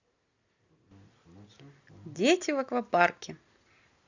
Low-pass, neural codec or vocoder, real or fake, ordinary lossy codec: 7.2 kHz; none; real; Opus, 64 kbps